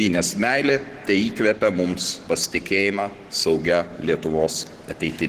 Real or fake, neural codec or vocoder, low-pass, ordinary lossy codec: fake; codec, 44.1 kHz, 7.8 kbps, Pupu-Codec; 14.4 kHz; Opus, 16 kbps